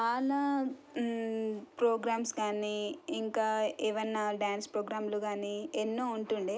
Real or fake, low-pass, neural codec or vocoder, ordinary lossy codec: real; none; none; none